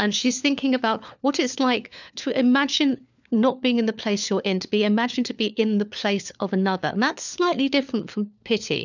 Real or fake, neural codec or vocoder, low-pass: fake; codec, 16 kHz, 4 kbps, FunCodec, trained on LibriTTS, 50 frames a second; 7.2 kHz